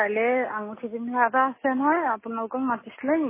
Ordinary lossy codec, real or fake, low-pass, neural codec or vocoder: MP3, 16 kbps; real; 3.6 kHz; none